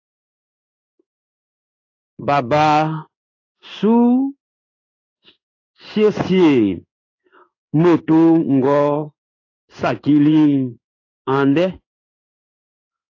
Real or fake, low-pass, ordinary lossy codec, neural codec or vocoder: fake; 7.2 kHz; AAC, 32 kbps; codec, 16 kHz in and 24 kHz out, 1 kbps, XY-Tokenizer